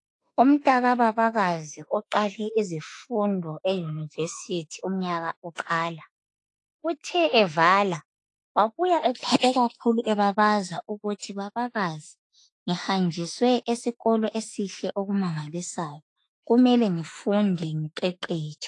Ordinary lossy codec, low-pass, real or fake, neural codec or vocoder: AAC, 48 kbps; 10.8 kHz; fake; autoencoder, 48 kHz, 32 numbers a frame, DAC-VAE, trained on Japanese speech